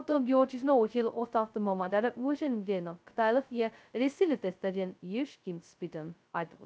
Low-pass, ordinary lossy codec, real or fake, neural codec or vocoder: none; none; fake; codec, 16 kHz, 0.2 kbps, FocalCodec